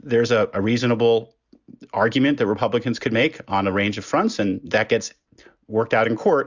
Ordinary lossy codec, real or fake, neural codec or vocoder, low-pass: Opus, 64 kbps; real; none; 7.2 kHz